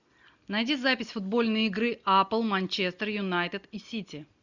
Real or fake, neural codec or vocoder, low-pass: real; none; 7.2 kHz